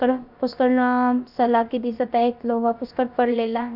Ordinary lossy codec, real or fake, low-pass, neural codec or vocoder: none; fake; 5.4 kHz; codec, 16 kHz, about 1 kbps, DyCAST, with the encoder's durations